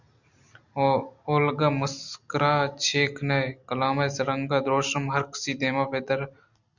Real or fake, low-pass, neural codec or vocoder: real; 7.2 kHz; none